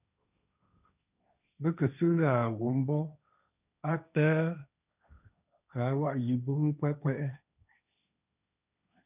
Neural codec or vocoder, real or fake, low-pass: codec, 16 kHz, 1.1 kbps, Voila-Tokenizer; fake; 3.6 kHz